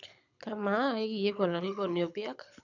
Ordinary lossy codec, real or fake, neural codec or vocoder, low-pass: none; fake; codec, 24 kHz, 6 kbps, HILCodec; 7.2 kHz